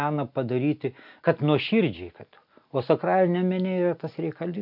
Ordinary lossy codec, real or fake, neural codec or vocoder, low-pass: AAC, 48 kbps; real; none; 5.4 kHz